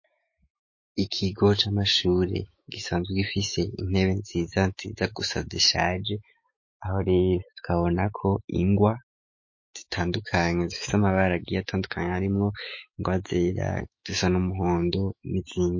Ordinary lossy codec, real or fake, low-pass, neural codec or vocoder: MP3, 32 kbps; fake; 7.2 kHz; autoencoder, 48 kHz, 128 numbers a frame, DAC-VAE, trained on Japanese speech